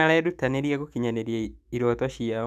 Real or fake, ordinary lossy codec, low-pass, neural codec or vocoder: fake; none; 14.4 kHz; autoencoder, 48 kHz, 128 numbers a frame, DAC-VAE, trained on Japanese speech